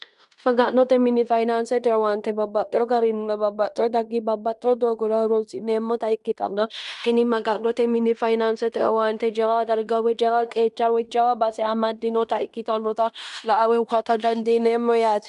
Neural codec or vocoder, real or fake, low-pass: codec, 16 kHz in and 24 kHz out, 0.9 kbps, LongCat-Audio-Codec, fine tuned four codebook decoder; fake; 10.8 kHz